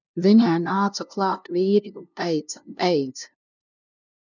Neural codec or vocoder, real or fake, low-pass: codec, 16 kHz, 0.5 kbps, FunCodec, trained on LibriTTS, 25 frames a second; fake; 7.2 kHz